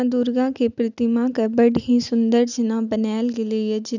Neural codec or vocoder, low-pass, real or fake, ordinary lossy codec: none; 7.2 kHz; real; none